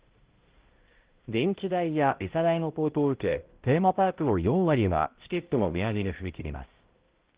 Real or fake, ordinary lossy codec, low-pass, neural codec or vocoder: fake; Opus, 16 kbps; 3.6 kHz; codec, 16 kHz, 0.5 kbps, X-Codec, HuBERT features, trained on balanced general audio